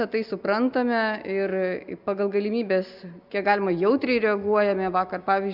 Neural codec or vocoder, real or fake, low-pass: none; real; 5.4 kHz